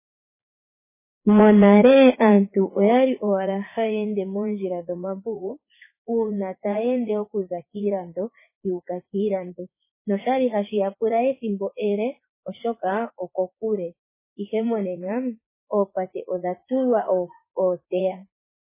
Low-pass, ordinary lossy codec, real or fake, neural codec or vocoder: 3.6 kHz; MP3, 16 kbps; fake; vocoder, 44.1 kHz, 80 mel bands, Vocos